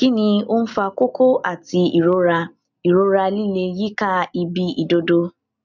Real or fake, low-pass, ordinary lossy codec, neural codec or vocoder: real; 7.2 kHz; none; none